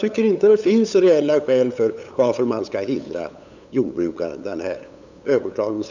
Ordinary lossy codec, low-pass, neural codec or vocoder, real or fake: none; 7.2 kHz; codec, 16 kHz, 8 kbps, FunCodec, trained on LibriTTS, 25 frames a second; fake